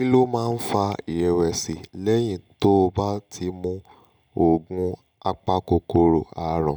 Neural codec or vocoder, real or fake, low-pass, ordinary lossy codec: none; real; none; none